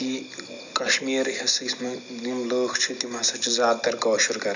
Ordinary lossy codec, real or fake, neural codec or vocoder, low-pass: none; real; none; 7.2 kHz